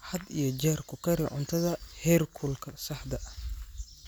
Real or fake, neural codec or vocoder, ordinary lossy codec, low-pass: real; none; none; none